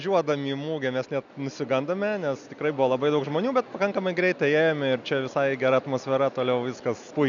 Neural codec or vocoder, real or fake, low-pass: none; real; 7.2 kHz